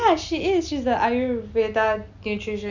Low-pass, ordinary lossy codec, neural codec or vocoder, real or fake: 7.2 kHz; none; none; real